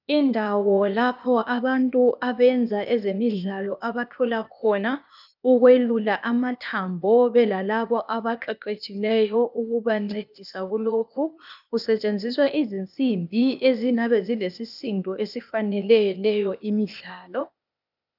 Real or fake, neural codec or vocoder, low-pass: fake; codec, 16 kHz, 0.8 kbps, ZipCodec; 5.4 kHz